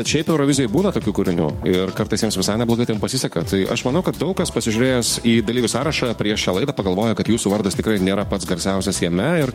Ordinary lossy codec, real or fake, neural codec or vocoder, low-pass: MP3, 64 kbps; fake; codec, 44.1 kHz, 7.8 kbps, DAC; 19.8 kHz